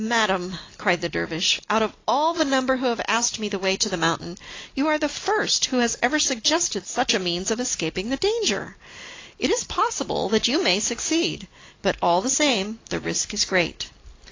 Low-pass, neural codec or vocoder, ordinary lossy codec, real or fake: 7.2 kHz; none; AAC, 32 kbps; real